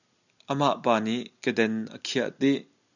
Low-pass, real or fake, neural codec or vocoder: 7.2 kHz; real; none